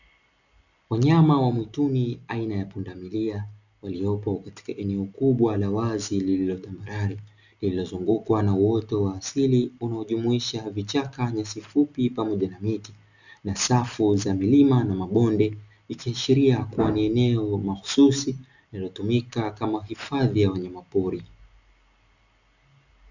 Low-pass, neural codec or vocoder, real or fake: 7.2 kHz; none; real